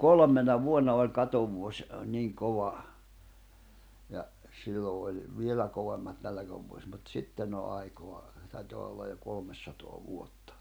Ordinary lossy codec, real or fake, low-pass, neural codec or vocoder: none; real; none; none